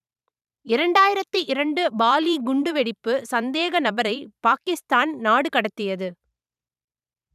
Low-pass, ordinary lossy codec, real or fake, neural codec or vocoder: 14.4 kHz; none; fake; vocoder, 44.1 kHz, 128 mel bands every 512 samples, BigVGAN v2